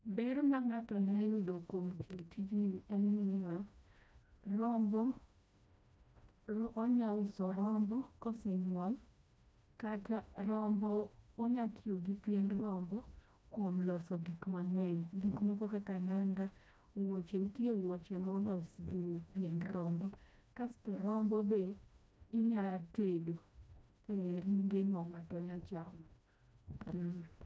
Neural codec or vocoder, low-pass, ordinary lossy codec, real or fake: codec, 16 kHz, 1 kbps, FreqCodec, smaller model; none; none; fake